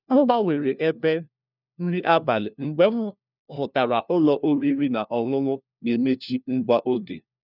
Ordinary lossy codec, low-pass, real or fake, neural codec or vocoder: none; 5.4 kHz; fake; codec, 16 kHz, 1 kbps, FunCodec, trained on LibriTTS, 50 frames a second